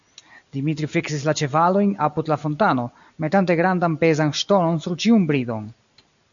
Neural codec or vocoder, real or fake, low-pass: none; real; 7.2 kHz